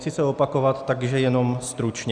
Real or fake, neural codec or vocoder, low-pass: real; none; 9.9 kHz